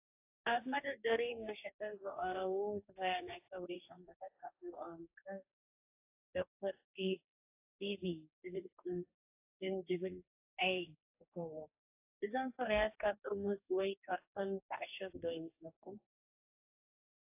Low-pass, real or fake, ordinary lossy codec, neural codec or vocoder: 3.6 kHz; fake; AAC, 32 kbps; codec, 44.1 kHz, 2.6 kbps, DAC